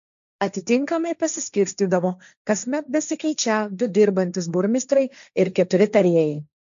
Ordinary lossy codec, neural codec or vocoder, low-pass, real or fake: MP3, 64 kbps; codec, 16 kHz, 1.1 kbps, Voila-Tokenizer; 7.2 kHz; fake